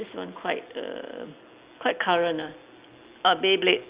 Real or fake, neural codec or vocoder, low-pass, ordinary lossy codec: real; none; 3.6 kHz; Opus, 64 kbps